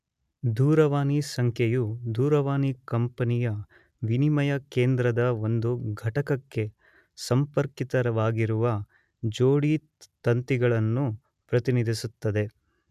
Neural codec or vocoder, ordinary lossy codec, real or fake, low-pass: none; none; real; 14.4 kHz